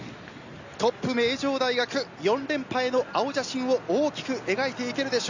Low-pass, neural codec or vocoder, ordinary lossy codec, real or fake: 7.2 kHz; none; Opus, 64 kbps; real